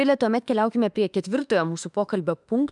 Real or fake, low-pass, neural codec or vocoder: fake; 10.8 kHz; autoencoder, 48 kHz, 32 numbers a frame, DAC-VAE, trained on Japanese speech